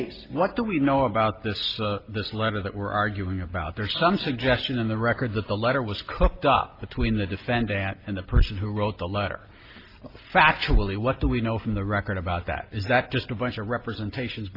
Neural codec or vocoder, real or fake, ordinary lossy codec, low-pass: none; real; Opus, 24 kbps; 5.4 kHz